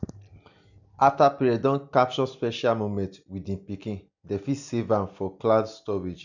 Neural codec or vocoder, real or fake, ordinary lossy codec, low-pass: none; real; none; 7.2 kHz